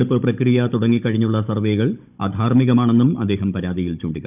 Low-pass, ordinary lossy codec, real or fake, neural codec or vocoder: 3.6 kHz; none; fake; codec, 16 kHz, 16 kbps, FunCodec, trained on Chinese and English, 50 frames a second